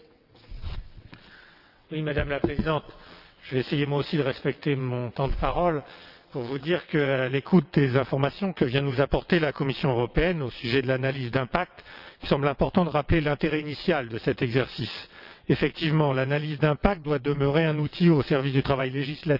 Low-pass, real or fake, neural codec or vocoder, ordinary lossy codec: 5.4 kHz; fake; vocoder, 22.05 kHz, 80 mel bands, WaveNeXt; none